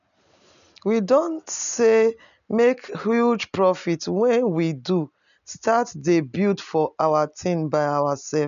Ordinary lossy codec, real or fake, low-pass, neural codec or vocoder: none; real; 7.2 kHz; none